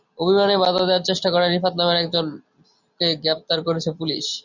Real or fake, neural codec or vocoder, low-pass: real; none; 7.2 kHz